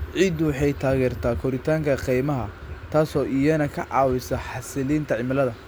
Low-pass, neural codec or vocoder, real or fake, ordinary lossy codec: none; none; real; none